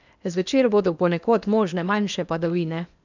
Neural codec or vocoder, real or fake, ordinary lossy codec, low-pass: codec, 16 kHz in and 24 kHz out, 0.8 kbps, FocalCodec, streaming, 65536 codes; fake; none; 7.2 kHz